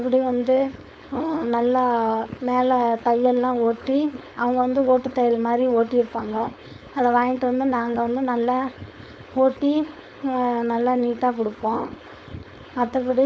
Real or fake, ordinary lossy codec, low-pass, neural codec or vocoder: fake; none; none; codec, 16 kHz, 4.8 kbps, FACodec